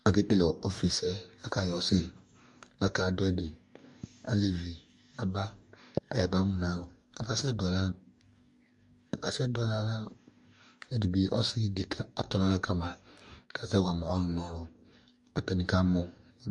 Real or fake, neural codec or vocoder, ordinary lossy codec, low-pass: fake; codec, 44.1 kHz, 2.6 kbps, DAC; MP3, 64 kbps; 10.8 kHz